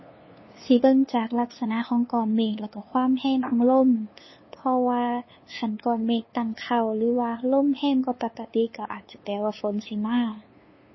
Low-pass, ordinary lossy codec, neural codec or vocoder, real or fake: 7.2 kHz; MP3, 24 kbps; codec, 16 kHz, 2 kbps, FunCodec, trained on LibriTTS, 25 frames a second; fake